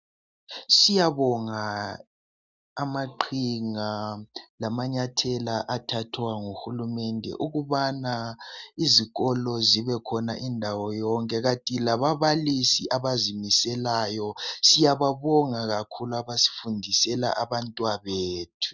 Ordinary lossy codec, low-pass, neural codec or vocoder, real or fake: Opus, 64 kbps; 7.2 kHz; none; real